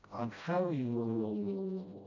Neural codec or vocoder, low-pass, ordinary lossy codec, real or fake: codec, 16 kHz, 0.5 kbps, FreqCodec, smaller model; 7.2 kHz; none; fake